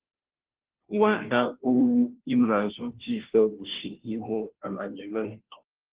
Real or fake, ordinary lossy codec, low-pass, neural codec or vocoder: fake; Opus, 16 kbps; 3.6 kHz; codec, 16 kHz, 0.5 kbps, FunCodec, trained on Chinese and English, 25 frames a second